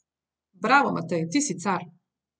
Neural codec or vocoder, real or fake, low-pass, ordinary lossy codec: none; real; none; none